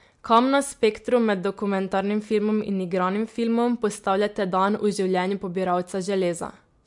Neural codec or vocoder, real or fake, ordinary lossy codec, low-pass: none; real; MP3, 64 kbps; 10.8 kHz